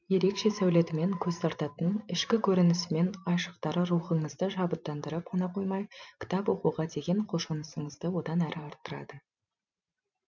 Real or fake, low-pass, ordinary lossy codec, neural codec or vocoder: fake; 7.2 kHz; none; vocoder, 44.1 kHz, 128 mel bands every 512 samples, BigVGAN v2